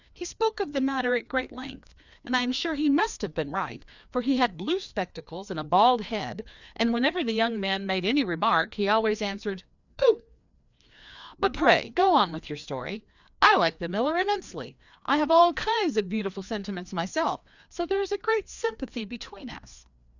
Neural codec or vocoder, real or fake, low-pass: codec, 16 kHz, 2 kbps, FreqCodec, larger model; fake; 7.2 kHz